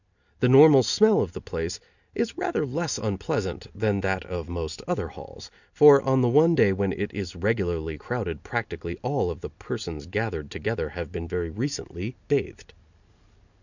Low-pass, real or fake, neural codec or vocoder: 7.2 kHz; real; none